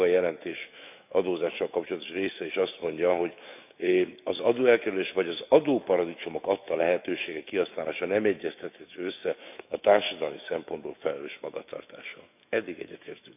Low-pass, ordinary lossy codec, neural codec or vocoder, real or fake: 3.6 kHz; none; autoencoder, 48 kHz, 128 numbers a frame, DAC-VAE, trained on Japanese speech; fake